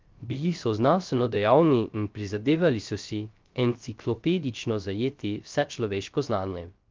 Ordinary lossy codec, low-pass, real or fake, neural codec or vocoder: Opus, 24 kbps; 7.2 kHz; fake; codec, 16 kHz, 0.3 kbps, FocalCodec